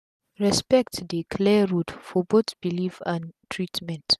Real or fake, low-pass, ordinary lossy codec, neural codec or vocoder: real; 14.4 kHz; none; none